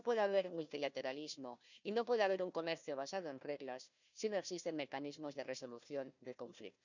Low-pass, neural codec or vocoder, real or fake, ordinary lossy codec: 7.2 kHz; codec, 16 kHz, 1 kbps, FunCodec, trained on Chinese and English, 50 frames a second; fake; none